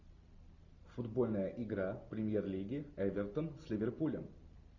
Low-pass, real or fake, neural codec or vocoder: 7.2 kHz; real; none